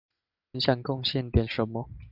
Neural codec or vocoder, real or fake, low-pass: none; real; 5.4 kHz